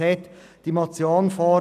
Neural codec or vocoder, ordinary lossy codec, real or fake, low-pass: none; none; real; 14.4 kHz